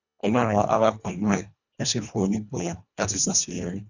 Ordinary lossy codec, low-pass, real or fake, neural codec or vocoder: none; 7.2 kHz; fake; codec, 24 kHz, 1.5 kbps, HILCodec